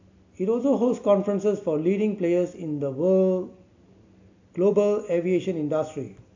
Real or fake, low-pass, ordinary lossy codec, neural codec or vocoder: real; 7.2 kHz; AAC, 48 kbps; none